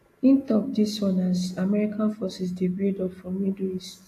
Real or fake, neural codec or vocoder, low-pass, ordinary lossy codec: real; none; 14.4 kHz; AAC, 64 kbps